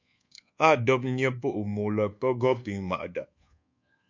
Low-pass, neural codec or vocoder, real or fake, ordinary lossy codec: 7.2 kHz; codec, 24 kHz, 1.2 kbps, DualCodec; fake; MP3, 48 kbps